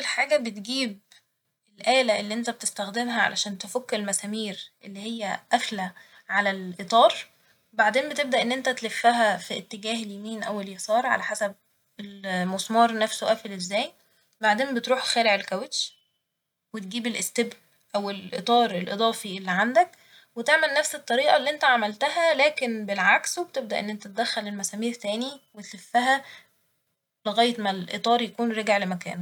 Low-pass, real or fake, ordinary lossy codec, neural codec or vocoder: 19.8 kHz; real; none; none